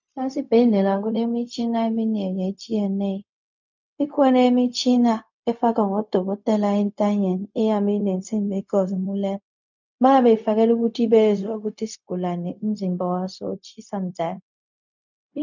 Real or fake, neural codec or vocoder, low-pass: fake; codec, 16 kHz, 0.4 kbps, LongCat-Audio-Codec; 7.2 kHz